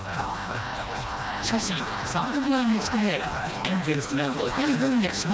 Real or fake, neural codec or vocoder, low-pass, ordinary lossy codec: fake; codec, 16 kHz, 1 kbps, FreqCodec, smaller model; none; none